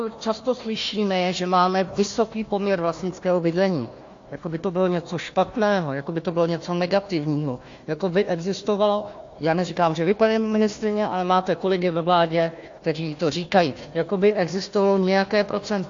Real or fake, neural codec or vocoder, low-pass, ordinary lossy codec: fake; codec, 16 kHz, 1 kbps, FunCodec, trained on Chinese and English, 50 frames a second; 7.2 kHz; AAC, 48 kbps